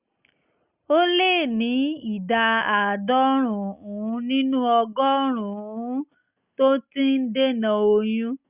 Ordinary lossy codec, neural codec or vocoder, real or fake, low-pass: Opus, 64 kbps; none; real; 3.6 kHz